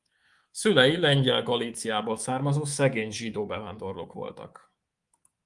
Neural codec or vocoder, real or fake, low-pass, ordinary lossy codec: codec, 24 kHz, 3.1 kbps, DualCodec; fake; 10.8 kHz; Opus, 24 kbps